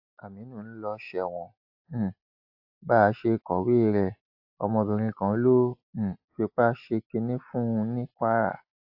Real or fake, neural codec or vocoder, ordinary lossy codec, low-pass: real; none; AAC, 48 kbps; 5.4 kHz